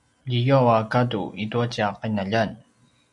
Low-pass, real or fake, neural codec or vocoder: 10.8 kHz; real; none